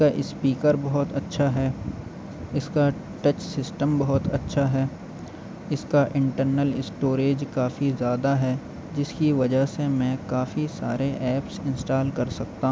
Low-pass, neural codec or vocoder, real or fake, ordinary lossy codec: none; none; real; none